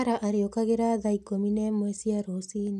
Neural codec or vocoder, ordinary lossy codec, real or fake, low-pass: none; none; real; 14.4 kHz